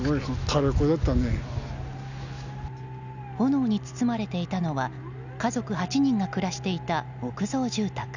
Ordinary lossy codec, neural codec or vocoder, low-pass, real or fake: none; none; 7.2 kHz; real